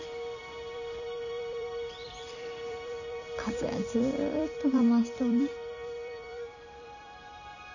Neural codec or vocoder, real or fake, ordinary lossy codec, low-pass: vocoder, 44.1 kHz, 128 mel bands every 256 samples, BigVGAN v2; fake; none; 7.2 kHz